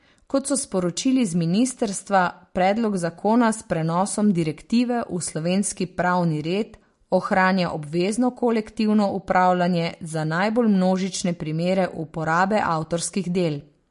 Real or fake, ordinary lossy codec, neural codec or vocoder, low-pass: real; MP3, 48 kbps; none; 10.8 kHz